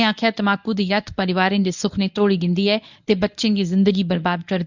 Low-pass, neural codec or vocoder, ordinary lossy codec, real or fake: 7.2 kHz; codec, 24 kHz, 0.9 kbps, WavTokenizer, medium speech release version 1; none; fake